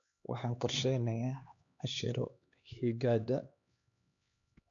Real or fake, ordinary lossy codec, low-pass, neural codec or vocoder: fake; none; 7.2 kHz; codec, 16 kHz, 2 kbps, X-Codec, HuBERT features, trained on LibriSpeech